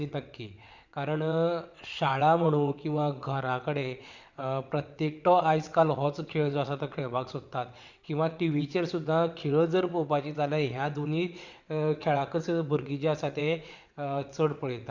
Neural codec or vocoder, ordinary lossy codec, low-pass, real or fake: vocoder, 22.05 kHz, 80 mel bands, WaveNeXt; Opus, 64 kbps; 7.2 kHz; fake